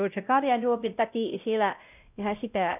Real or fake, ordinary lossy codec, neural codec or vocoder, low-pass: fake; none; codec, 16 kHz, 0.5 kbps, X-Codec, WavLM features, trained on Multilingual LibriSpeech; 3.6 kHz